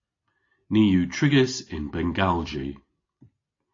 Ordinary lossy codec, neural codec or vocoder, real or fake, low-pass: AAC, 32 kbps; none; real; 7.2 kHz